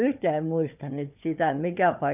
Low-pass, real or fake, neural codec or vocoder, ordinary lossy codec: 3.6 kHz; fake; codec, 16 kHz, 4 kbps, FunCodec, trained on Chinese and English, 50 frames a second; none